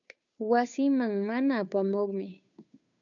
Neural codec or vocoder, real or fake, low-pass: codec, 16 kHz, 2 kbps, FunCodec, trained on Chinese and English, 25 frames a second; fake; 7.2 kHz